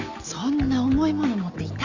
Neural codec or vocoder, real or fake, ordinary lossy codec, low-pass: none; real; Opus, 64 kbps; 7.2 kHz